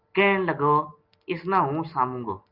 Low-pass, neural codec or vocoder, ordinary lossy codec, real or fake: 5.4 kHz; none; Opus, 32 kbps; real